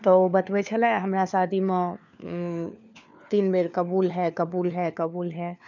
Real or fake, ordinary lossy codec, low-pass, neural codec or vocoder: fake; none; 7.2 kHz; codec, 16 kHz, 4 kbps, FunCodec, trained on LibriTTS, 50 frames a second